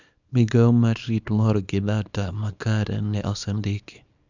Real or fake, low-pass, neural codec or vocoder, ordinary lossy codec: fake; 7.2 kHz; codec, 24 kHz, 0.9 kbps, WavTokenizer, small release; none